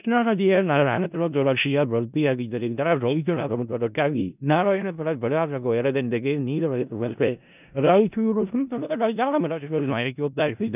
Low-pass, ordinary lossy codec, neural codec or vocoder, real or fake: 3.6 kHz; none; codec, 16 kHz in and 24 kHz out, 0.4 kbps, LongCat-Audio-Codec, four codebook decoder; fake